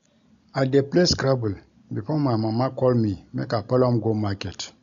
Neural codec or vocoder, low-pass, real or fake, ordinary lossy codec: none; 7.2 kHz; real; none